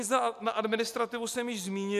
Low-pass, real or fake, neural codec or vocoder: 14.4 kHz; real; none